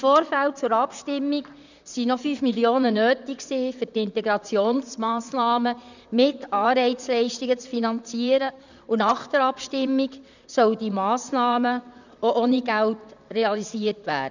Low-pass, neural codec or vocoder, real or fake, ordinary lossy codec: 7.2 kHz; vocoder, 44.1 kHz, 128 mel bands, Pupu-Vocoder; fake; none